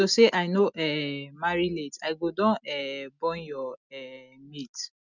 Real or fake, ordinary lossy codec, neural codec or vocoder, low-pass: real; none; none; 7.2 kHz